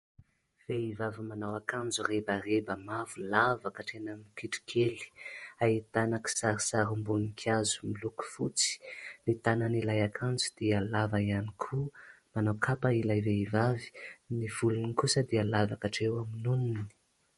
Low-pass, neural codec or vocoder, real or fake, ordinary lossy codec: 19.8 kHz; vocoder, 48 kHz, 128 mel bands, Vocos; fake; MP3, 48 kbps